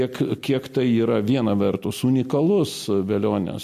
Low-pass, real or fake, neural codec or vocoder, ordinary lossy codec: 14.4 kHz; real; none; MP3, 64 kbps